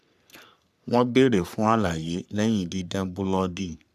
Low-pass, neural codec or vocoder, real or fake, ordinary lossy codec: 14.4 kHz; codec, 44.1 kHz, 3.4 kbps, Pupu-Codec; fake; none